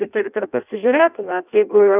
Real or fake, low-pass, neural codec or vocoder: fake; 3.6 kHz; codec, 16 kHz in and 24 kHz out, 0.6 kbps, FireRedTTS-2 codec